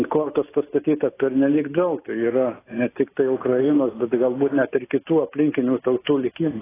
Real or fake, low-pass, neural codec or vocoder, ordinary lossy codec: real; 3.6 kHz; none; AAC, 16 kbps